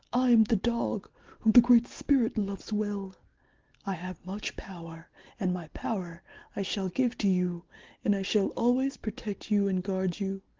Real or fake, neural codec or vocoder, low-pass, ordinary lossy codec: real; none; 7.2 kHz; Opus, 16 kbps